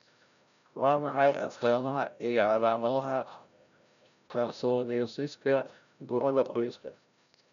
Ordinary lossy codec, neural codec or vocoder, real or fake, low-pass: none; codec, 16 kHz, 0.5 kbps, FreqCodec, larger model; fake; 7.2 kHz